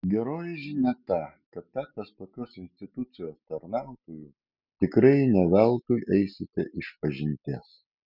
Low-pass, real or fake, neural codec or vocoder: 5.4 kHz; real; none